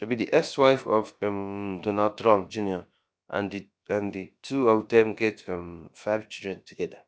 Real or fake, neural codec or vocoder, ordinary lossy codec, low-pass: fake; codec, 16 kHz, about 1 kbps, DyCAST, with the encoder's durations; none; none